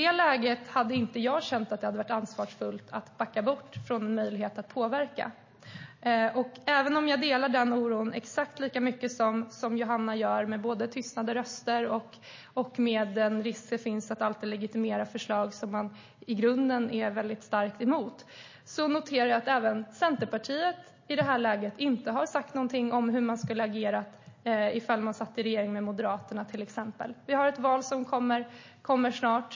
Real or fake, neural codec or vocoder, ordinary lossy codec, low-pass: real; none; MP3, 32 kbps; 7.2 kHz